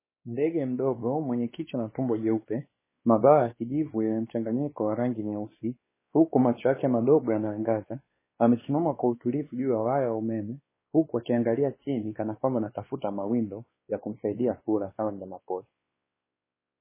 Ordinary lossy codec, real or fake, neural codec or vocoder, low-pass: MP3, 16 kbps; fake; codec, 16 kHz, 2 kbps, X-Codec, WavLM features, trained on Multilingual LibriSpeech; 3.6 kHz